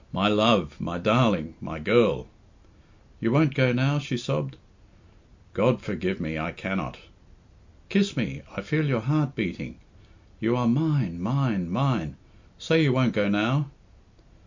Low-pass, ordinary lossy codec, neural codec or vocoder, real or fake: 7.2 kHz; MP3, 64 kbps; none; real